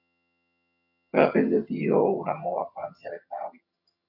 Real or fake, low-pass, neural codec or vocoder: fake; 5.4 kHz; vocoder, 22.05 kHz, 80 mel bands, HiFi-GAN